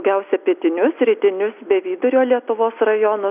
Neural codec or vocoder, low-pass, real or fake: none; 3.6 kHz; real